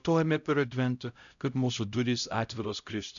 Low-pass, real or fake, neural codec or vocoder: 7.2 kHz; fake; codec, 16 kHz, 0.5 kbps, X-Codec, HuBERT features, trained on LibriSpeech